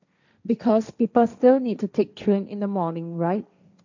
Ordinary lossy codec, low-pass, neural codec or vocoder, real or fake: none; 7.2 kHz; codec, 16 kHz, 1.1 kbps, Voila-Tokenizer; fake